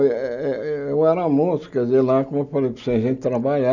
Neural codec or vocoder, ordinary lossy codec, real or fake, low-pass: none; none; real; 7.2 kHz